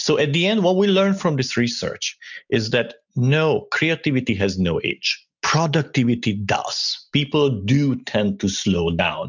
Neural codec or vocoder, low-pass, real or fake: none; 7.2 kHz; real